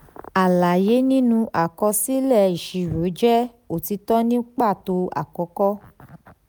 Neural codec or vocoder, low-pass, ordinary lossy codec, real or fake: none; none; none; real